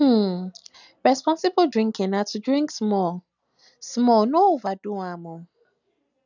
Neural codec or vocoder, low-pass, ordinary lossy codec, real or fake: none; 7.2 kHz; none; real